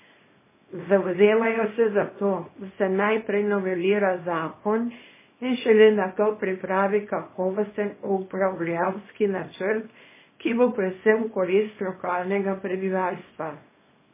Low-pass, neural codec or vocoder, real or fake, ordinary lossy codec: 3.6 kHz; codec, 24 kHz, 0.9 kbps, WavTokenizer, small release; fake; MP3, 16 kbps